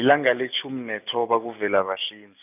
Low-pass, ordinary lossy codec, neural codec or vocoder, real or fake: 3.6 kHz; none; none; real